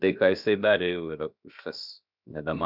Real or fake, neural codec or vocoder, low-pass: fake; codec, 16 kHz, about 1 kbps, DyCAST, with the encoder's durations; 5.4 kHz